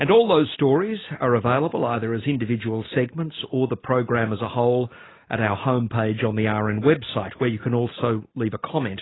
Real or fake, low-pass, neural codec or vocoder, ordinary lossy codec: real; 7.2 kHz; none; AAC, 16 kbps